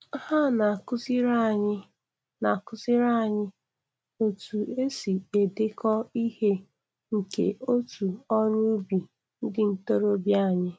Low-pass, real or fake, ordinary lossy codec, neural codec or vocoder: none; real; none; none